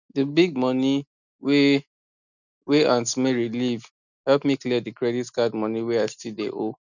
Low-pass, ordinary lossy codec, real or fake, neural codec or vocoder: 7.2 kHz; none; real; none